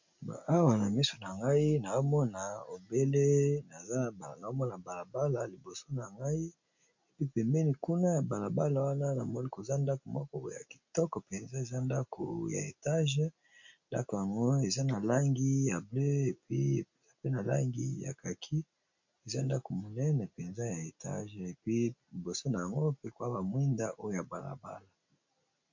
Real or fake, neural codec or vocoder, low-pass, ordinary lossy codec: real; none; 7.2 kHz; MP3, 48 kbps